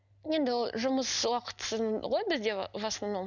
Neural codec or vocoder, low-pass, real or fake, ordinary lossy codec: none; 7.2 kHz; real; none